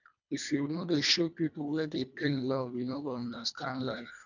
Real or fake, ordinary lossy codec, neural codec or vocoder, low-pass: fake; none; codec, 24 kHz, 1.5 kbps, HILCodec; 7.2 kHz